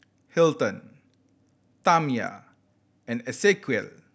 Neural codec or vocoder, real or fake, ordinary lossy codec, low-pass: none; real; none; none